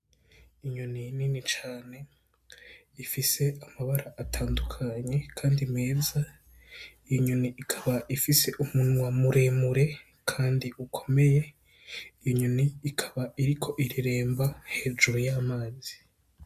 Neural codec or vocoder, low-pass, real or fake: none; 14.4 kHz; real